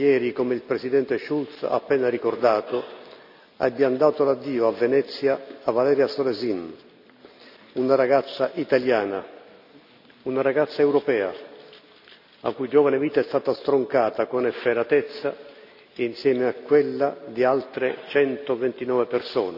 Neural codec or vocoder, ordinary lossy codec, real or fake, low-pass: none; none; real; 5.4 kHz